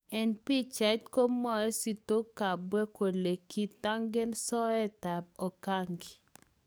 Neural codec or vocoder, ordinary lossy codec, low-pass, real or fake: codec, 44.1 kHz, 7.8 kbps, DAC; none; none; fake